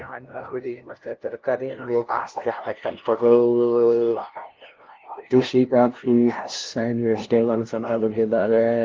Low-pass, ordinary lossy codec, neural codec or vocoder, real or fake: 7.2 kHz; Opus, 16 kbps; codec, 16 kHz, 0.5 kbps, FunCodec, trained on LibriTTS, 25 frames a second; fake